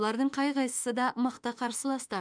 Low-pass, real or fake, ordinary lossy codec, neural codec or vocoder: 9.9 kHz; fake; none; autoencoder, 48 kHz, 32 numbers a frame, DAC-VAE, trained on Japanese speech